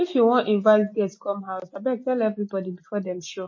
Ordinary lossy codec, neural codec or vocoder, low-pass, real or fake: MP3, 32 kbps; none; 7.2 kHz; real